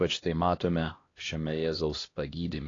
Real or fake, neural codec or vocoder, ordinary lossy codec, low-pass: fake; codec, 16 kHz, 1 kbps, X-Codec, HuBERT features, trained on LibriSpeech; AAC, 32 kbps; 7.2 kHz